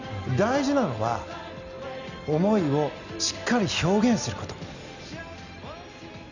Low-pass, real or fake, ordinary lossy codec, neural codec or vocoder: 7.2 kHz; real; none; none